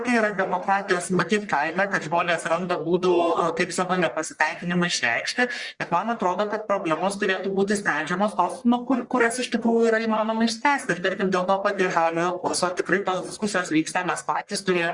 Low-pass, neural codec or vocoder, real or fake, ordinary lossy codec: 10.8 kHz; codec, 44.1 kHz, 1.7 kbps, Pupu-Codec; fake; Opus, 64 kbps